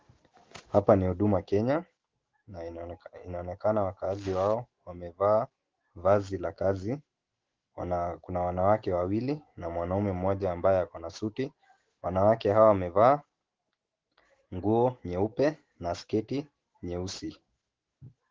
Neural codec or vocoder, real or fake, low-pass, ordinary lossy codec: autoencoder, 48 kHz, 128 numbers a frame, DAC-VAE, trained on Japanese speech; fake; 7.2 kHz; Opus, 16 kbps